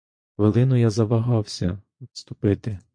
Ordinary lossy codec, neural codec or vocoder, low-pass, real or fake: MP3, 48 kbps; vocoder, 22.05 kHz, 80 mel bands, Vocos; 9.9 kHz; fake